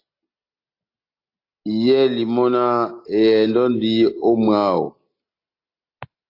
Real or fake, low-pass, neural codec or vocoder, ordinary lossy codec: real; 5.4 kHz; none; AAC, 32 kbps